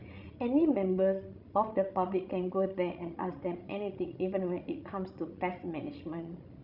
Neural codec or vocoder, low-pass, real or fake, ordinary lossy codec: codec, 16 kHz, 16 kbps, FreqCodec, larger model; 5.4 kHz; fake; none